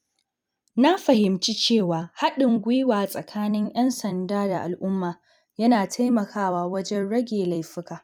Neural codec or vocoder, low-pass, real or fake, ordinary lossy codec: vocoder, 44.1 kHz, 128 mel bands every 256 samples, BigVGAN v2; 19.8 kHz; fake; none